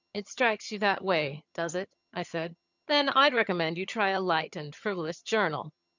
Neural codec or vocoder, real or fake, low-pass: vocoder, 22.05 kHz, 80 mel bands, HiFi-GAN; fake; 7.2 kHz